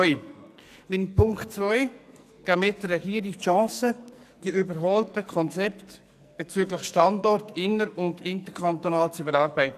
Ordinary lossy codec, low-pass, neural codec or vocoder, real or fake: none; 14.4 kHz; codec, 44.1 kHz, 2.6 kbps, SNAC; fake